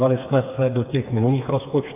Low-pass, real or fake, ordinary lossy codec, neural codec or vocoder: 3.6 kHz; fake; AAC, 24 kbps; codec, 16 kHz, 4 kbps, FreqCodec, smaller model